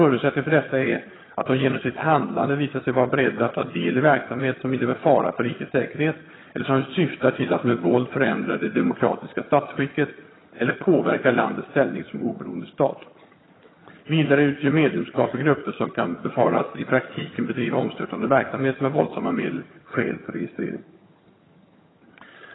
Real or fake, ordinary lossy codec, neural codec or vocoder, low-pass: fake; AAC, 16 kbps; vocoder, 22.05 kHz, 80 mel bands, HiFi-GAN; 7.2 kHz